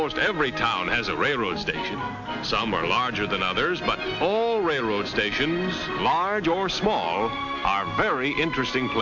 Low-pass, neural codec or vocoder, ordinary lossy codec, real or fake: 7.2 kHz; none; MP3, 48 kbps; real